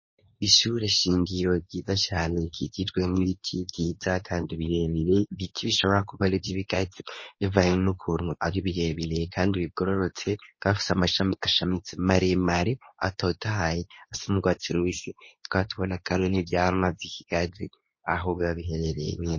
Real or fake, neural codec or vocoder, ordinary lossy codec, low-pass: fake; codec, 24 kHz, 0.9 kbps, WavTokenizer, medium speech release version 2; MP3, 32 kbps; 7.2 kHz